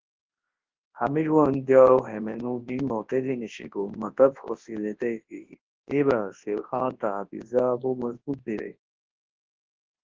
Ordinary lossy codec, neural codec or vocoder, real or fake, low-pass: Opus, 16 kbps; codec, 24 kHz, 0.9 kbps, WavTokenizer, large speech release; fake; 7.2 kHz